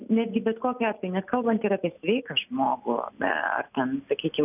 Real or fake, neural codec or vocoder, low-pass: real; none; 3.6 kHz